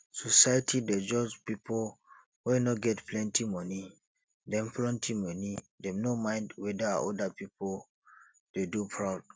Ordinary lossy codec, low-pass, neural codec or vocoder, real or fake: none; none; none; real